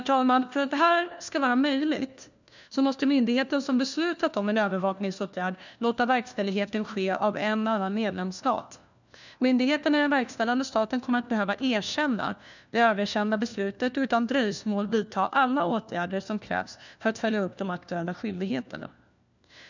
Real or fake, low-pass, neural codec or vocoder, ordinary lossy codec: fake; 7.2 kHz; codec, 16 kHz, 1 kbps, FunCodec, trained on LibriTTS, 50 frames a second; none